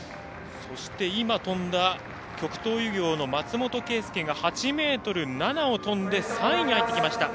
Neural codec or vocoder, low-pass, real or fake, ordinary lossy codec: none; none; real; none